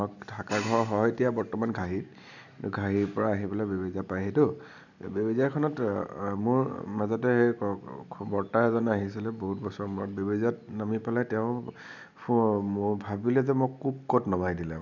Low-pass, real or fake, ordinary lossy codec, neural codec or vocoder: 7.2 kHz; real; none; none